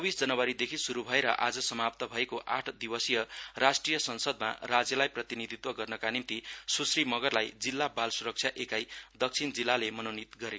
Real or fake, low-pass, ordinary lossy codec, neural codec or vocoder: real; none; none; none